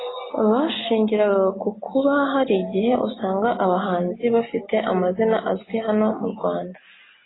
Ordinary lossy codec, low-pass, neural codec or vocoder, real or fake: AAC, 16 kbps; 7.2 kHz; none; real